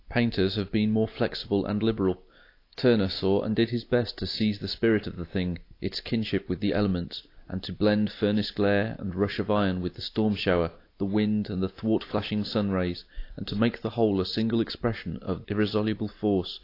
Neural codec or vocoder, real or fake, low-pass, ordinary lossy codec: none; real; 5.4 kHz; AAC, 32 kbps